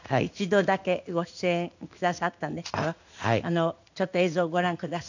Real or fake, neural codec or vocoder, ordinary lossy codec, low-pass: fake; codec, 16 kHz in and 24 kHz out, 1 kbps, XY-Tokenizer; none; 7.2 kHz